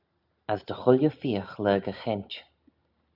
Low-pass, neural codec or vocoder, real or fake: 5.4 kHz; none; real